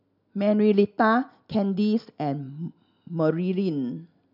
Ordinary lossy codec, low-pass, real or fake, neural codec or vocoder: none; 5.4 kHz; real; none